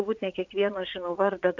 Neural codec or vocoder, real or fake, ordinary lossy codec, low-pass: vocoder, 44.1 kHz, 80 mel bands, Vocos; fake; AAC, 48 kbps; 7.2 kHz